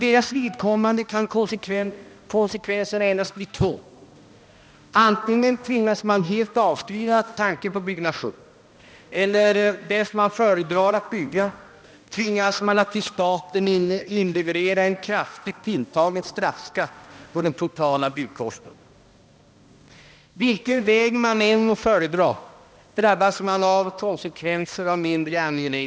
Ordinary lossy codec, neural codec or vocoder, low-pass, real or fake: none; codec, 16 kHz, 1 kbps, X-Codec, HuBERT features, trained on balanced general audio; none; fake